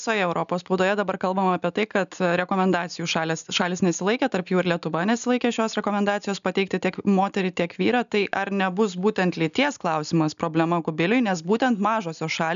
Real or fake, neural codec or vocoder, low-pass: real; none; 7.2 kHz